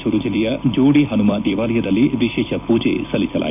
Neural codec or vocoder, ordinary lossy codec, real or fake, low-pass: vocoder, 44.1 kHz, 128 mel bands every 256 samples, BigVGAN v2; none; fake; 3.6 kHz